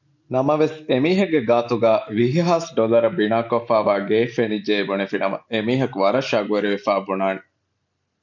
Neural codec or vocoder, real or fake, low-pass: none; real; 7.2 kHz